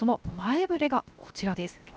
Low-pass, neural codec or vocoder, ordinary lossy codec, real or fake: none; codec, 16 kHz, 0.7 kbps, FocalCodec; none; fake